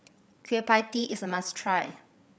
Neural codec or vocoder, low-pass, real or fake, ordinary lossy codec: codec, 16 kHz, 8 kbps, FreqCodec, larger model; none; fake; none